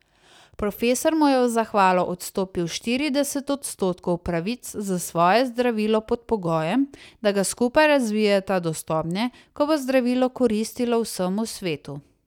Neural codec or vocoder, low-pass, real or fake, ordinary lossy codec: none; 19.8 kHz; real; none